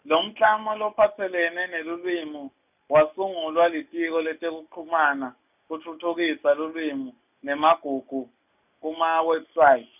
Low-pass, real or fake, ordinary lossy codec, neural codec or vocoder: 3.6 kHz; real; none; none